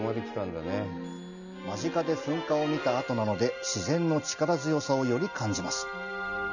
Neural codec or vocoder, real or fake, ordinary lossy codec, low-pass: none; real; none; 7.2 kHz